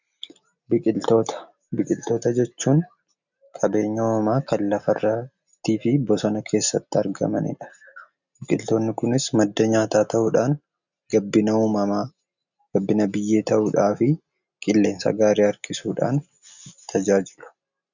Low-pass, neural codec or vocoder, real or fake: 7.2 kHz; none; real